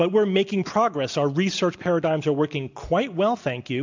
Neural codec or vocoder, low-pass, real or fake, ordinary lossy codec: none; 7.2 kHz; real; MP3, 64 kbps